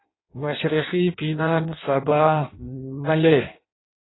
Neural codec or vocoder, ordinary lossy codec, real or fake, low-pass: codec, 16 kHz in and 24 kHz out, 0.6 kbps, FireRedTTS-2 codec; AAC, 16 kbps; fake; 7.2 kHz